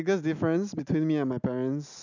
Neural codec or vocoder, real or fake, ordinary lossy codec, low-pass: none; real; none; 7.2 kHz